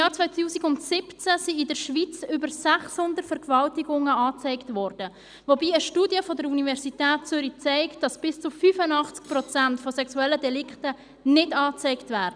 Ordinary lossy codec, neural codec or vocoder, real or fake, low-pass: none; none; real; 9.9 kHz